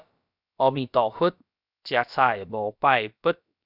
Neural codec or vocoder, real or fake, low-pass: codec, 16 kHz, about 1 kbps, DyCAST, with the encoder's durations; fake; 5.4 kHz